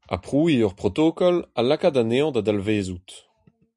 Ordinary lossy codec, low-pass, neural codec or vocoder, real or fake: MP3, 96 kbps; 10.8 kHz; none; real